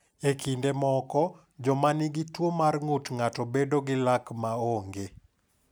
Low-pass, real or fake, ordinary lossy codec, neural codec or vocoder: none; real; none; none